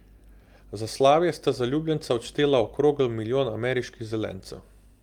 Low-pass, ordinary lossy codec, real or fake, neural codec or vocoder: 19.8 kHz; Opus, 32 kbps; real; none